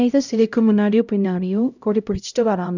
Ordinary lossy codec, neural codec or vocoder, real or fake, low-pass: none; codec, 16 kHz, 0.5 kbps, X-Codec, HuBERT features, trained on LibriSpeech; fake; 7.2 kHz